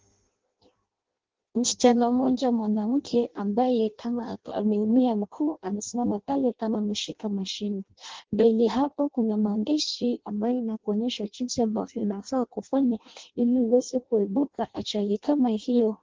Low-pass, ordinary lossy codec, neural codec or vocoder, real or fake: 7.2 kHz; Opus, 16 kbps; codec, 16 kHz in and 24 kHz out, 0.6 kbps, FireRedTTS-2 codec; fake